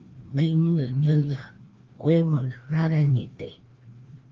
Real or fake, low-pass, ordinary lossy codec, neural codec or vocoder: fake; 7.2 kHz; Opus, 16 kbps; codec, 16 kHz, 1 kbps, FreqCodec, larger model